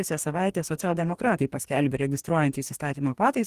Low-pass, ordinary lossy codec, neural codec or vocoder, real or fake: 14.4 kHz; Opus, 24 kbps; codec, 44.1 kHz, 2.6 kbps, DAC; fake